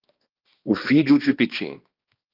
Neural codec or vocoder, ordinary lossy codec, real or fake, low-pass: codec, 16 kHz, 2 kbps, X-Codec, HuBERT features, trained on balanced general audio; Opus, 24 kbps; fake; 5.4 kHz